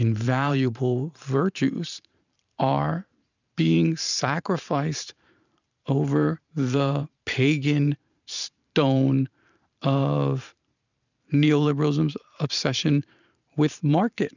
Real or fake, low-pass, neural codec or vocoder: fake; 7.2 kHz; vocoder, 44.1 kHz, 128 mel bands every 512 samples, BigVGAN v2